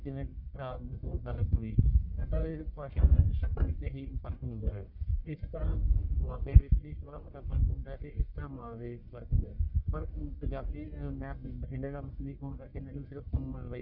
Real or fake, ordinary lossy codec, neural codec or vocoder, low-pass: fake; none; codec, 44.1 kHz, 1.7 kbps, Pupu-Codec; 5.4 kHz